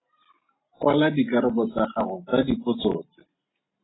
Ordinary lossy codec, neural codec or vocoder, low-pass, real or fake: AAC, 16 kbps; none; 7.2 kHz; real